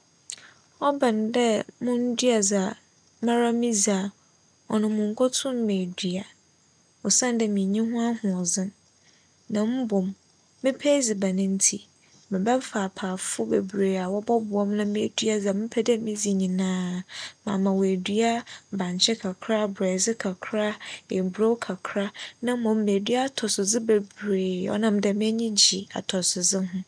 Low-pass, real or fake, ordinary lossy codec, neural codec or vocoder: 9.9 kHz; real; none; none